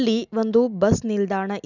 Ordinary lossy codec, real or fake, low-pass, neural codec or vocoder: none; real; 7.2 kHz; none